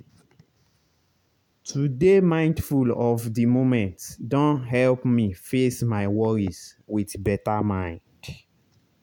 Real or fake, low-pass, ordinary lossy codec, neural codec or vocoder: real; 19.8 kHz; none; none